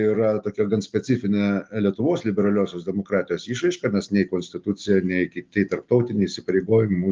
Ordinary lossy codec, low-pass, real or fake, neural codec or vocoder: Opus, 32 kbps; 7.2 kHz; real; none